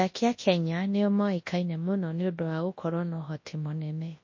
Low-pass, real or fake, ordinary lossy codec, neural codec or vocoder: 7.2 kHz; fake; MP3, 32 kbps; codec, 24 kHz, 0.9 kbps, WavTokenizer, large speech release